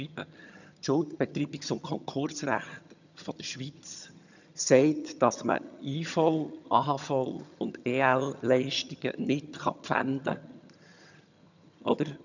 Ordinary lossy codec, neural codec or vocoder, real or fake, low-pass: none; vocoder, 22.05 kHz, 80 mel bands, HiFi-GAN; fake; 7.2 kHz